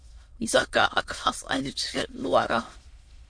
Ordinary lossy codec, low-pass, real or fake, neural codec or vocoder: MP3, 48 kbps; 9.9 kHz; fake; autoencoder, 22.05 kHz, a latent of 192 numbers a frame, VITS, trained on many speakers